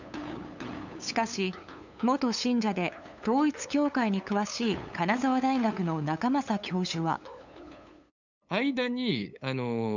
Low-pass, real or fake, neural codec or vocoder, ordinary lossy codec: 7.2 kHz; fake; codec, 16 kHz, 8 kbps, FunCodec, trained on LibriTTS, 25 frames a second; none